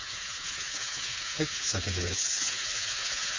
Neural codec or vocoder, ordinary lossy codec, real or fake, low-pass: codec, 16 kHz, 4 kbps, FreqCodec, smaller model; MP3, 32 kbps; fake; 7.2 kHz